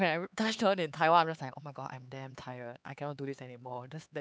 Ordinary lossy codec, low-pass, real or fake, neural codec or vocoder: none; none; fake; codec, 16 kHz, 4 kbps, X-Codec, HuBERT features, trained on LibriSpeech